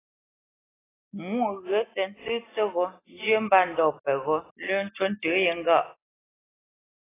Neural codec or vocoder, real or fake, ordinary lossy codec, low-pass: none; real; AAC, 16 kbps; 3.6 kHz